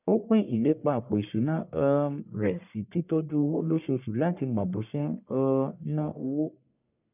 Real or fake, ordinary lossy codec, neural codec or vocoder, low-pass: fake; none; codec, 44.1 kHz, 1.7 kbps, Pupu-Codec; 3.6 kHz